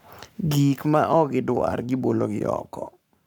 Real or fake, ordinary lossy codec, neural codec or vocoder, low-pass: fake; none; codec, 44.1 kHz, 7.8 kbps, Pupu-Codec; none